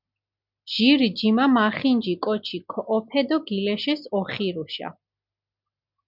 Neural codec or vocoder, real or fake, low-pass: none; real; 5.4 kHz